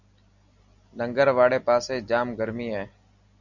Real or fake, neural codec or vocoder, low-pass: real; none; 7.2 kHz